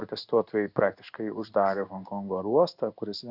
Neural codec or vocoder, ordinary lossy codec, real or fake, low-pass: codec, 16 kHz in and 24 kHz out, 1 kbps, XY-Tokenizer; Opus, 64 kbps; fake; 5.4 kHz